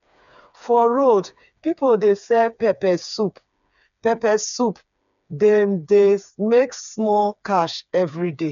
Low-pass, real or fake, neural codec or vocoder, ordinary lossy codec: 7.2 kHz; fake; codec, 16 kHz, 4 kbps, FreqCodec, smaller model; none